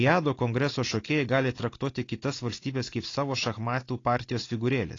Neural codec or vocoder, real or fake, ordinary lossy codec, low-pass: none; real; AAC, 32 kbps; 7.2 kHz